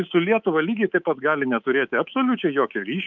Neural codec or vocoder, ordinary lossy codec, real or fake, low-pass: codec, 24 kHz, 3.1 kbps, DualCodec; Opus, 32 kbps; fake; 7.2 kHz